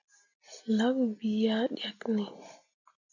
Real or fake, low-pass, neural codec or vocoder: real; 7.2 kHz; none